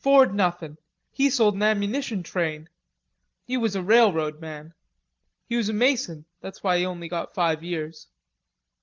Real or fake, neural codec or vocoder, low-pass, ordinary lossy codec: real; none; 7.2 kHz; Opus, 32 kbps